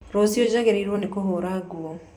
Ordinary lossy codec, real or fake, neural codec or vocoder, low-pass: none; fake; vocoder, 44.1 kHz, 128 mel bands every 256 samples, BigVGAN v2; 19.8 kHz